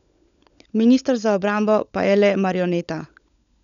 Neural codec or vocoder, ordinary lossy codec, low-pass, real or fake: codec, 16 kHz, 16 kbps, FunCodec, trained on LibriTTS, 50 frames a second; none; 7.2 kHz; fake